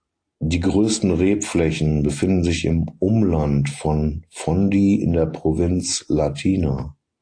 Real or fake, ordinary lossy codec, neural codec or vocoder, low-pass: real; AAC, 48 kbps; none; 9.9 kHz